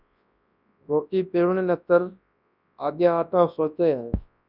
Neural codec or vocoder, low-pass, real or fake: codec, 24 kHz, 0.9 kbps, WavTokenizer, large speech release; 5.4 kHz; fake